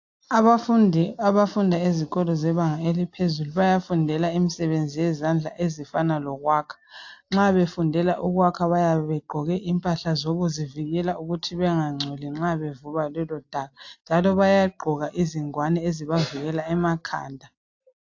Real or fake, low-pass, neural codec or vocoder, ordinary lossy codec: real; 7.2 kHz; none; AAC, 48 kbps